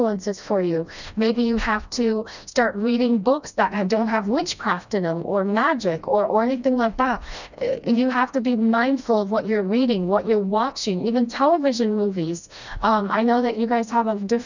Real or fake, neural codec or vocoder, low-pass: fake; codec, 16 kHz, 1 kbps, FreqCodec, smaller model; 7.2 kHz